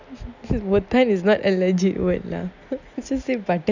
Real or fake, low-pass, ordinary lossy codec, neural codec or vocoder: real; 7.2 kHz; none; none